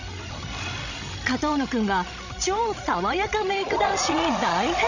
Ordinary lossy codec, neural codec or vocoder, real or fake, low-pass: none; codec, 16 kHz, 16 kbps, FreqCodec, larger model; fake; 7.2 kHz